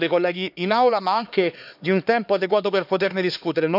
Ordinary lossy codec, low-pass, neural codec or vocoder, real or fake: none; 5.4 kHz; codec, 16 kHz, 2 kbps, X-Codec, HuBERT features, trained on LibriSpeech; fake